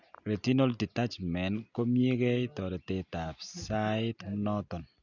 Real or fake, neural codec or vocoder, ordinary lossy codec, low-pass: real; none; none; 7.2 kHz